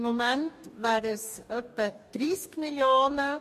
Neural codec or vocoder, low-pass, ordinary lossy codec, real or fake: codec, 44.1 kHz, 2.6 kbps, DAC; 14.4 kHz; MP3, 64 kbps; fake